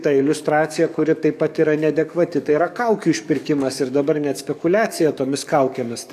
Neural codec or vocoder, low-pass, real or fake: codec, 44.1 kHz, 7.8 kbps, DAC; 14.4 kHz; fake